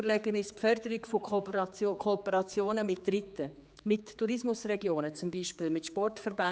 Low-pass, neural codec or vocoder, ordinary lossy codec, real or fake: none; codec, 16 kHz, 4 kbps, X-Codec, HuBERT features, trained on general audio; none; fake